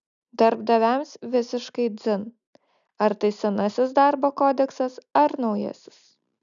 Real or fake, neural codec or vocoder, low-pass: real; none; 7.2 kHz